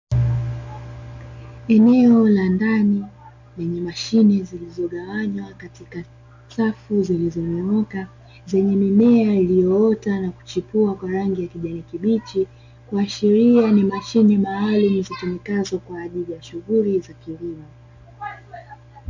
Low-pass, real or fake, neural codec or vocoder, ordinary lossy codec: 7.2 kHz; real; none; MP3, 64 kbps